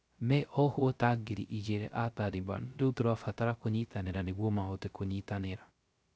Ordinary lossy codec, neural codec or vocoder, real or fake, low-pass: none; codec, 16 kHz, 0.3 kbps, FocalCodec; fake; none